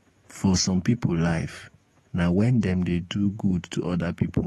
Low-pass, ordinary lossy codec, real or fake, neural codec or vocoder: 19.8 kHz; AAC, 32 kbps; fake; autoencoder, 48 kHz, 128 numbers a frame, DAC-VAE, trained on Japanese speech